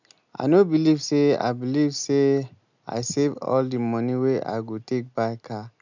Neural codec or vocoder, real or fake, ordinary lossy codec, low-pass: none; real; none; 7.2 kHz